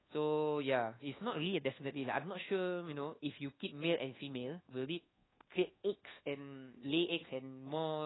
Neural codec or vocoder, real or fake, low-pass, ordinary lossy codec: codec, 24 kHz, 1.2 kbps, DualCodec; fake; 7.2 kHz; AAC, 16 kbps